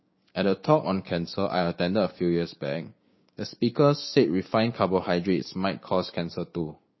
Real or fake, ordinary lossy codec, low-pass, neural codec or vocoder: fake; MP3, 24 kbps; 7.2 kHz; codec, 16 kHz, 6 kbps, DAC